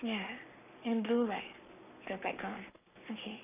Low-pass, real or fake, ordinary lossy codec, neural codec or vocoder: 3.6 kHz; fake; none; codec, 44.1 kHz, 7.8 kbps, Pupu-Codec